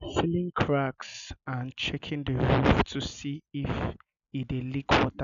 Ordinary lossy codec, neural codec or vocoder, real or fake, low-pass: none; none; real; 7.2 kHz